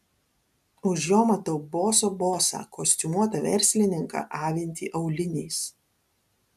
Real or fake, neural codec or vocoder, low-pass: real; none; 14.4 kHz